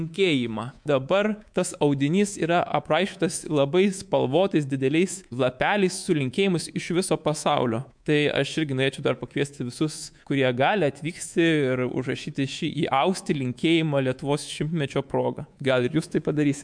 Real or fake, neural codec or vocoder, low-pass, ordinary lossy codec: fake; codec, 24 kHz, 3.1 kbps, DualCodec; 9.9 kHz; MP3, 64 kbps